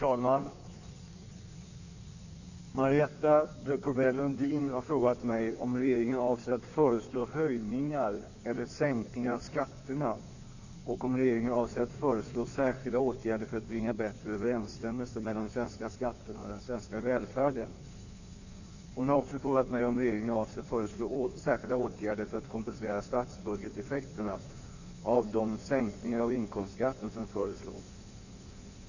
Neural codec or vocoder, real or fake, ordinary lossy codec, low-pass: codec, 16 kHz in and 24 kHz out, 1.1 kbps, FireRedTTS-2 codec; fake; none; 7.2 kHz